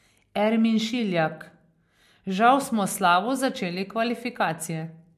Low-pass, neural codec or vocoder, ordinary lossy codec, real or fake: 14.4 kHz; none; MP3, 64 kbps; real